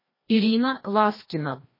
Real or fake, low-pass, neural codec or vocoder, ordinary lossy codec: fake; 5.4 kHz; codec, 16 kHz, 1 kbps, FreqCodec, larger model; MP3, 24 kbps